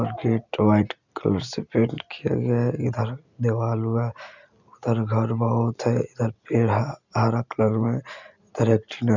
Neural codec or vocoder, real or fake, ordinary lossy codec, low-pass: none; real; none; 7.2 kHz